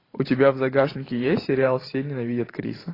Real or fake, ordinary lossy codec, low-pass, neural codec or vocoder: fake; AAC, 24 kbps; 5.4 kHz; vocoder, 44.1 kHz, 128 mel bands every 512 samples, BigVGAN v2